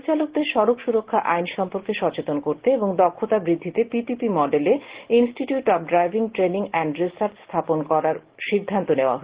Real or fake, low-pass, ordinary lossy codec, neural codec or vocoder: real; 3.6 kHz; Opus, 16 kbps; none